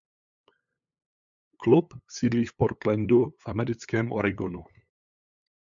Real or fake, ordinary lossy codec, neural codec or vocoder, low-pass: fake; MP3, 64 kbps; codec, 16 kHz, 8 kbps, FunCodec, trained on LibriTTS, 25 frames a second; 7.2 kHz